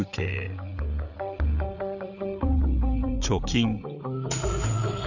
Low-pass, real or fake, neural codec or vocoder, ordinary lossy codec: 7.2 kHz; fake; codec, 16 kHz, 8 kbps, FreqCodec, larger model; none